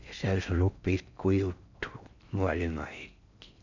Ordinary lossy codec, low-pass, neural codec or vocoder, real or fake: none; 7.2 kHz; codec, 16 kHz in and 24 kHz out, 0.6 kbps, FocalCodec, streaming, 4096 codes; fake